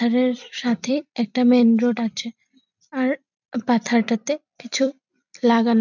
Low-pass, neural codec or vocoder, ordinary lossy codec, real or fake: 7.2 kHz; vocoder, 44.1 kHz, 128 mel bands every 256 samples, BigVGAN v2; none; fake